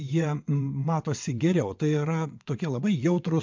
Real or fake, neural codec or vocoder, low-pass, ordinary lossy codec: fake; vocoder, 22.05 kHz, 80 mel bands, WaveNeXt; 7.2 kHz; MP3, 64 kbps